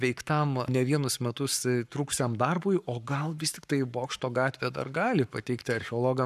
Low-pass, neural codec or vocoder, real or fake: 14.4 kHz; codec, 44.1 kHz, 7.8 kbps, Pupu-Codec; fake